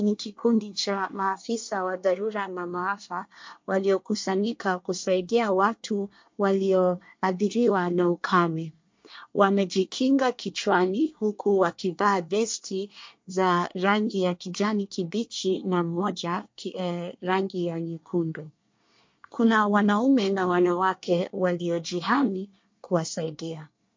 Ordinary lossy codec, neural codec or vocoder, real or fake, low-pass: MP3, 48 kbps; codec, 24 kHz, 1 kbps, SNAC; fake; 7.2 kHz